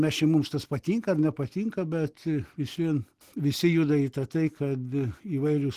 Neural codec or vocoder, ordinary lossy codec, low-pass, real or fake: none; Opus, 16 kbps; 14.4 kHz; real